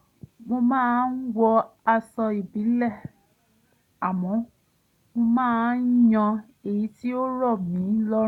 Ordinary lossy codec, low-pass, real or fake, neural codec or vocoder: none; 19.8 kHz; real; none